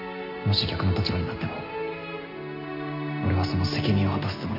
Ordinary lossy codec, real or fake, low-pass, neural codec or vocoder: MP3, 48 kbps; real; 5.4 kHz; none